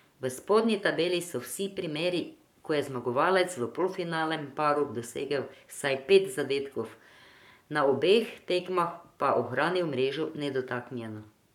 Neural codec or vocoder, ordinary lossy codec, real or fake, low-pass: codec, 44.1 kHz, 7.8 kbps, Pupu-Codec; none; fake; 19.8 kHz